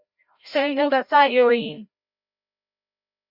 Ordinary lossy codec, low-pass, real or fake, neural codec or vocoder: Opus, 64 kbps; 5.4 kHz; fake; codec, 16 kHz, 0.5 kbps, FreqCodec, larger model